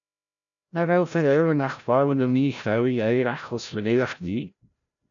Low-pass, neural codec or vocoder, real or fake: 7.2 kHz; codec, 16 kHz, 0.5 kbps, FreqCodec, larger model; fake